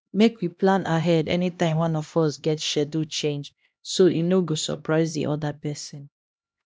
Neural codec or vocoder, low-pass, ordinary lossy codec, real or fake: codec, 16 kHz, 1 kbps, X-Codec, HuBERT features, trained on LibriSpeech; none; none; fake